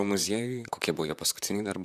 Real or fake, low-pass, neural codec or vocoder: fake; 14.4 kHz; vocoder, 44.1 kHz, 128 mel bands, Pupu-Vocoder